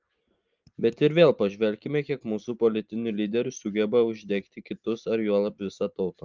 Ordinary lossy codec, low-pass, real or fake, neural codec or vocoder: Opus, 32 kbps; 7.2 kHz; real; none